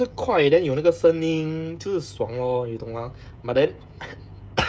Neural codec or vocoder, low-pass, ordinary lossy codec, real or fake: codec, 16 kHz, 16 kbps, FreqCodec, smaller model; none; none; fake